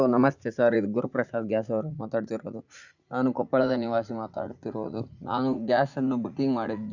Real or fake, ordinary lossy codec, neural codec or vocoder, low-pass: fake; none; vocoder, 44.1 kHz, 80 mel bands, Vocos; 7.2 kHz